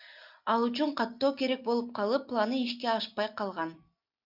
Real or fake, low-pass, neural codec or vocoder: real; 5.4 kHz; none